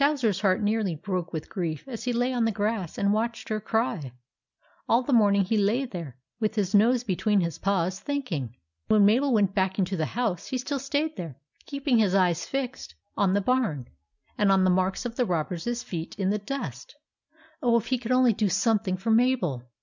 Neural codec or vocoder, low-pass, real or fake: none; 7.2 kHz; real